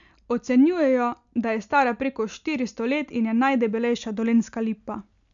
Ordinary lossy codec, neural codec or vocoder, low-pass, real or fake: none; none; 7.2 kHz; real